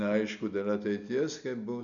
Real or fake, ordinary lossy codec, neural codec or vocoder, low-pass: real; MP3, 96 kbps; none; 7.2 kHz